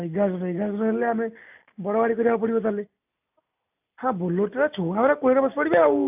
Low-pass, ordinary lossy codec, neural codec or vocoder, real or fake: 3.6 kHz; AAC, 32 kbps; none; real